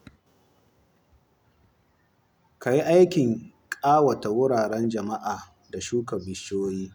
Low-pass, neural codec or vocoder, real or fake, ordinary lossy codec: none; none; real; none